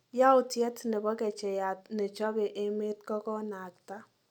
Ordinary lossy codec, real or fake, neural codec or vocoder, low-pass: none; real; none; 19.8 kHz